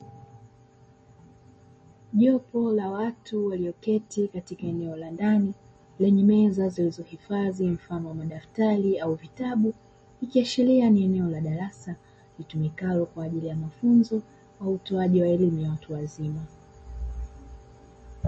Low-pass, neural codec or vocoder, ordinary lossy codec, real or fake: 9.9 kHz; none; MP3, 32 kbps; real